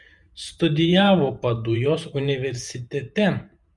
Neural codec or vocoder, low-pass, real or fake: vocoder, 48 kHz, 128 mel bands, Vocos; 10.8 kHz; fake